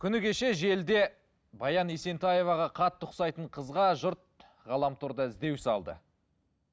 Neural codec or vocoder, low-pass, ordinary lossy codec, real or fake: none; none; none; real